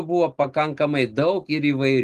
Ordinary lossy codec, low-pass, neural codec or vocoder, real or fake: Opus, 24 kbps; 14.4 kHz; none; real